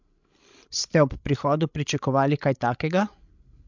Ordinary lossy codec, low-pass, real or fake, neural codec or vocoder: MP3, 64 kbps; 7.2 kHz; fake; codec, 16 kHz, 16 kbps, FreqCodec, larger model